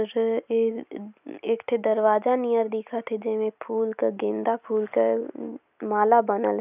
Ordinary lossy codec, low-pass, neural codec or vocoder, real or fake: none; 3.6 kHz; none; real